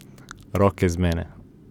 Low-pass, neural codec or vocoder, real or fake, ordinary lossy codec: 19.8 kHz; none; real; none